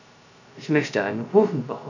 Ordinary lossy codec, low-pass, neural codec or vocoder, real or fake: none; 7.2 kHz; codec, 16 kHz, 0.2 kbps, FocalCodec; fake